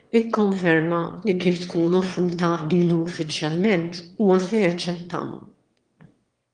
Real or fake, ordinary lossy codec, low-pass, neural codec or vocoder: fake; Opus, 24 kbps; 9.9 kHz; autoencoder, 22.05 kHz, a latent of 192 numbers a frame, VITS, trained on one speaker